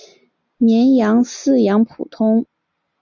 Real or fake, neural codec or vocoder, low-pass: real; none; 7.2 kHz